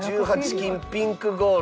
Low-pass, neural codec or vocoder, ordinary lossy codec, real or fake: none; none; none; real